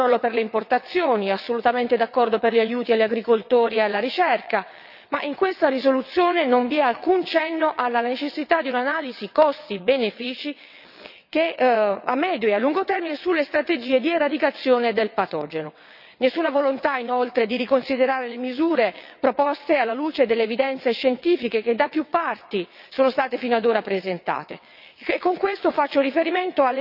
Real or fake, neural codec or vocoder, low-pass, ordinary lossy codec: fake; vocoder, 22.05 kHz, 80 mel bands, WaveNeXt; 5.4 kHz; none